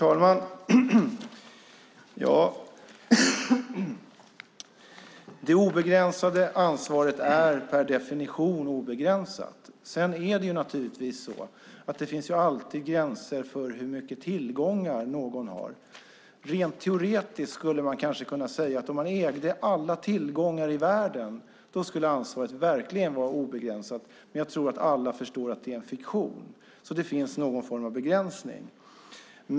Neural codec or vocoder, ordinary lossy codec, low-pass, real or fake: none; none; none; real